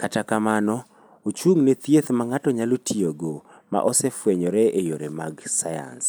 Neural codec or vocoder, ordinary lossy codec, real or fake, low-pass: none; none; real; none